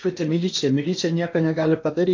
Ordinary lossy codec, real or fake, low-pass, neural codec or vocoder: AAC, 48 kbps; fake; 7.2 kHz; codec, 16 kHz in and 24 kHz out, 0.8 kbps, FocalCodec, streaming, 65536 codes